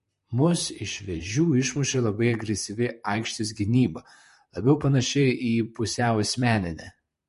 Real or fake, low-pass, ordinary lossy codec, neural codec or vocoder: fake; 14.4 kHz; MP3, 48 kbps; vocoder, 44.1 kHz, 128 mel bands, Pupu-Vocoder